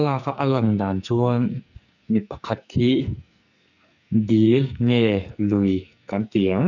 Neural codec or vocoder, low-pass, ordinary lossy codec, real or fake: codec, 44.1 kHz, 2.6 kbps, SNAC; 7.2 kHz; none; fake